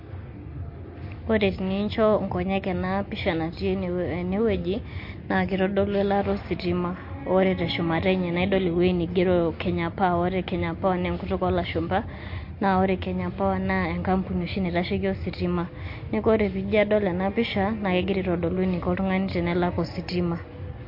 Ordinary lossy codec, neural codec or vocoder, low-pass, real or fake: MP3, 32 kbps; none; 5.4 kHz; real